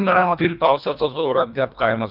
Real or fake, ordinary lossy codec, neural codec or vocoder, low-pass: fake; none; codec, 24 kHz, 1.5 kbps, HILCodec; 5.4 kHz